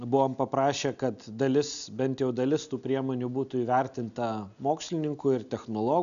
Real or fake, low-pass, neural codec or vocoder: real; 7.2 kHz; none